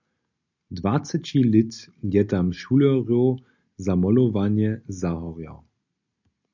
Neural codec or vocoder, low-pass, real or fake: none; 7.2 kHz; real